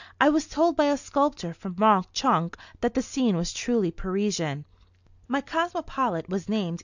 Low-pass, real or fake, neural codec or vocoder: 7.2 kHz; real; none